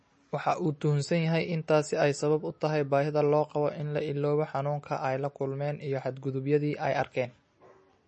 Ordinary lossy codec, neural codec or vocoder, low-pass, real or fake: MP3, 32 kbps; none; 10.8 kHz; real